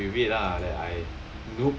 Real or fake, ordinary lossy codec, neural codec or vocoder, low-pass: real; none; none; none